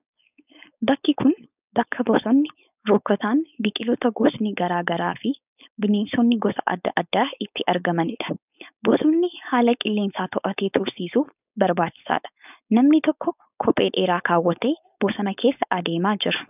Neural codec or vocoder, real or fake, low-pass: codec, 16 kHz, 4.8 kbps, FACodec; fake; 3.6 kHz